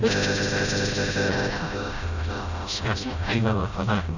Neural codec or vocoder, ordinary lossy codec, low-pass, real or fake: codec, 16 kHz, 0.5 kbps, FreqCodec, smaller model; none; 7.2 kHz; fake